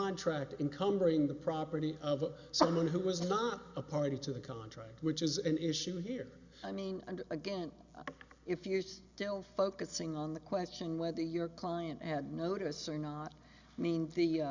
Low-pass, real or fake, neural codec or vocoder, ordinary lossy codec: 7.2 kHz; real; none; Opus, 64 kbps